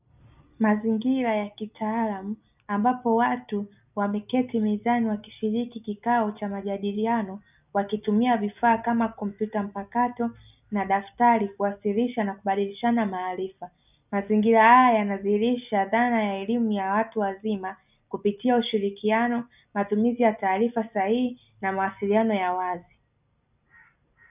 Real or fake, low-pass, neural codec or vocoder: real; 3.6 kHz; none